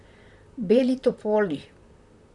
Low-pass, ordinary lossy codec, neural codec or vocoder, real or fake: 10.8 kHz; none; vocoder, 44.1 kHz, 128 mel bands, Pupu-Vocoder; fake